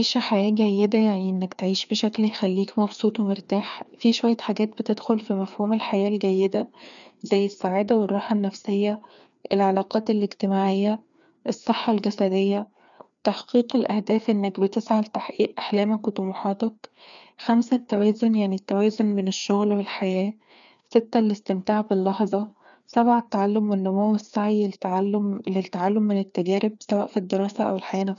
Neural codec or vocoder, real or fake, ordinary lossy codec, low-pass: codec, 16 kHz, 2 kbps, FreqCodec, larger model; fake; none; 7.2 kHz